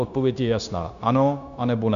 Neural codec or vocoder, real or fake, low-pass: codec, 16 kHz, 0.9 kbps, LongCat-Audio-Codec; fake; 7.2 kHz